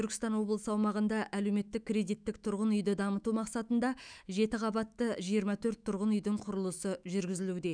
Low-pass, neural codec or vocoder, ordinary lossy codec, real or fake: 9.9 kHz; autoencoder, 48 kHz, 128 numbers a frame, DAC-VAE, trained on Japanese speech; none; fake